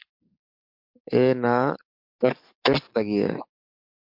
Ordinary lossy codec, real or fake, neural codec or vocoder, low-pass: MP3, 48 kbps; fake; codec, 44.1 kHz, 7.8 kbps, DAC; 5.4 kHz